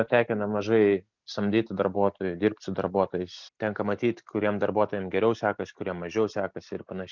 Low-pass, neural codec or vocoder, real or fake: 7.2 kHz; none; real